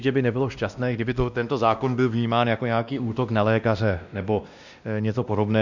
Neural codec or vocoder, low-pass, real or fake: codec, 16 kHz, 1 kbps, X-Codec, WavLM features, trained on Multilingual LibriSpeech; 7.2 kHz; fake